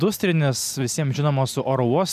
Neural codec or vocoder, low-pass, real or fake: none; 14.4 kHz; real